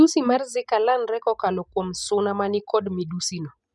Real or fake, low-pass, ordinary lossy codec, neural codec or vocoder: real; 10.8 kHz; none; none